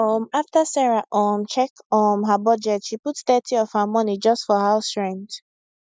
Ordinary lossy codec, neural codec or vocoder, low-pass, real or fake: none; none; none; real